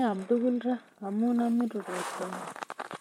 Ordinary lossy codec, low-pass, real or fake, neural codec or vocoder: MP3, 64 kbps; 19.8 kHz; fake; vocoder, 44.1 kHz, 128 mel bands, Pupu-Vocoder